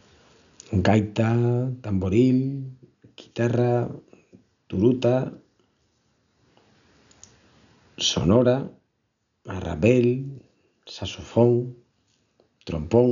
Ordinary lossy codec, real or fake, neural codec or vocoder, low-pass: none; real; none; 7.2 kHz